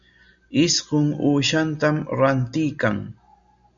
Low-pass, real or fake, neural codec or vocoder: 7.2 kHz; real; none